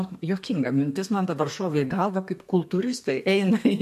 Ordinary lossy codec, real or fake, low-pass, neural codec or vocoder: MP3, 64 kbps; fake; 14.4 kHz; codec, 32 kHz, 1.9 kbps, SNAC